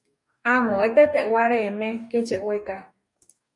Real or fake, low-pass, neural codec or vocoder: fake; 10.8 kHz; codec, 44.1 kHz, 2.6 kbps, DAC